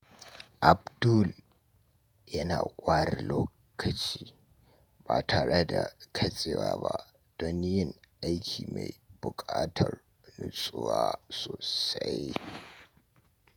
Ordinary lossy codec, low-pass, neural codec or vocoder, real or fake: none; none; vocoder, 48 kHz, 128 mel bands, Vocos; fake